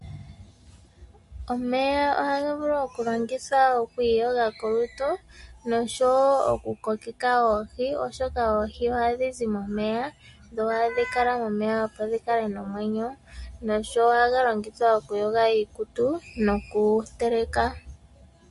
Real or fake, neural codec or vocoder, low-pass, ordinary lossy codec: real; none; 14.4 kHz; MP3, 48 kbps